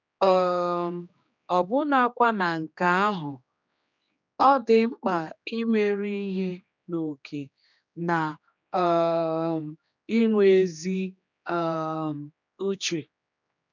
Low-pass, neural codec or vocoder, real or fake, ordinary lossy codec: 7.2 kHz; codec, 16 kHz, 2 kbps, X-Codec, HuBERT features, trained on general audio; fake; none